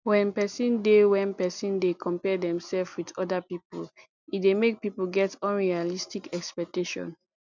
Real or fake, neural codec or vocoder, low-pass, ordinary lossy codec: real; none; 7.2 kHz; none